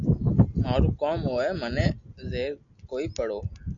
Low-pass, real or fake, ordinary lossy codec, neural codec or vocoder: 7.2 kHz; real; MP3, 96 kbps; none